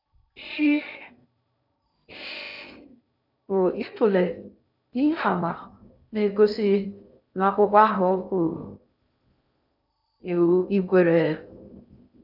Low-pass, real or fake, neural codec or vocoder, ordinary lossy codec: 5.4 kHz; fake; codec, 16 kHz in and 24 kHz out, 0.6 kbps, FocalCodec, streaming, 2048 codes; none